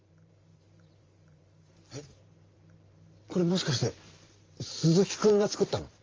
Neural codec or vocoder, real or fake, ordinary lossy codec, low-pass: vocoder, 44.1 kHz, 128 mel bands every 512 samples, BigVGAN v2; fake; Opus, 32 kbps; 7.2 kHz